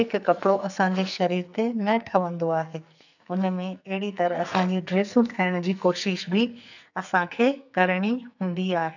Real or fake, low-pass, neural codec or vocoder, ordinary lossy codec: fake; 7.2 kHz; codec, 44.1 kHz, 2.6 kbps, SNAC; none